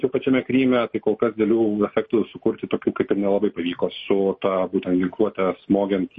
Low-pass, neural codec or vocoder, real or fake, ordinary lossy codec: 10.8 kHz; none; real; MP3, 32 kbps